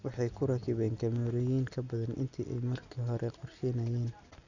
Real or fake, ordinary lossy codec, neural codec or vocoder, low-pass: real; none; none; 7.2 kHz